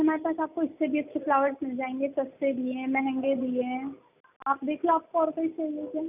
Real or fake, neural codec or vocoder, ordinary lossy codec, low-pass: real; none; none; 3.6 kHz